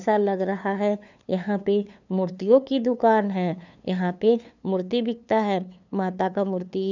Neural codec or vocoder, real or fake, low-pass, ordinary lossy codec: codec, 16 kHz, 2 kbps, FunCodec, trained on LibriTTS, 25 frames a second; fake; 7.2 kHz; none